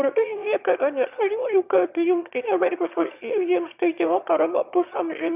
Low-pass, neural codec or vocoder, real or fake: 3.6 kHz; autoencoder, 22.05 kHz, a latent of 192 numbers a frame, VITS, trained on one speaker; fake